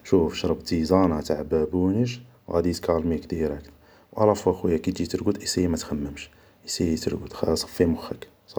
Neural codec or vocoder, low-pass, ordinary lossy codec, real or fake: none; none; none; real